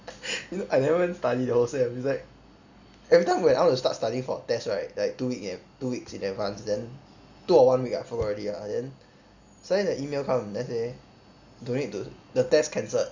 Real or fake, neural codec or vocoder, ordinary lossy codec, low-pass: real; none; Opus, 64 kbps; 7.2 kHz